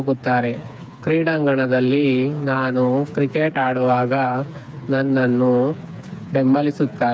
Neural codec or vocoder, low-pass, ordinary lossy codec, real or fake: codec, 16 kHz, 4 kbps, FreqCodec, smaller model; none; none; fake